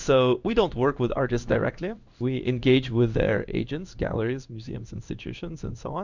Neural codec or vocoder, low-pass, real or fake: codec, 16 kHz in and 24 kHz out, 1 kbps, XY-Tokenizer; 7.2 kHz; fake